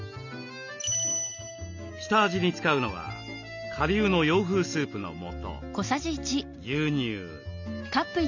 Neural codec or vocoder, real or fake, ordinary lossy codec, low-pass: none; real; none; 7.2 kHz